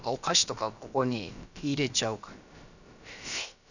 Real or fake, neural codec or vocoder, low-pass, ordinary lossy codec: fake; codec, 16 kHz, about 1 kbps, DyCAST, with the encoder's durations; 7.2 kHz; none